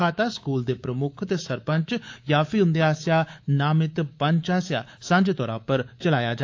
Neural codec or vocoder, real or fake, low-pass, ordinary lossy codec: codec, 24 kHz, 3.1 kbps, DualCodec; fake; 7.2 kHz; AAC, 48 kbps